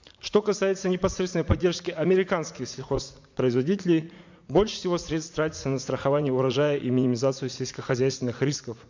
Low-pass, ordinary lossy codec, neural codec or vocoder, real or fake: 7.2 kHz; MP3, 64 kbps; vocoder, 44.1 kHz, 80 mel bands, Vocos; fake